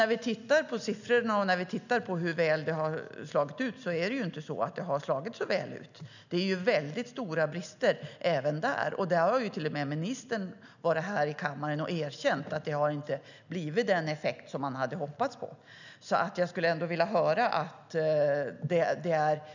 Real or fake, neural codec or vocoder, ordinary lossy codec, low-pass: real; none; none; 7.2 kHz